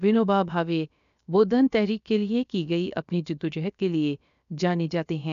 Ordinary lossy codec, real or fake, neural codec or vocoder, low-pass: none; fake; codec, 16 kHz, about 1 kbps, DyCAST, with the encoder's durations; 7.2 kHz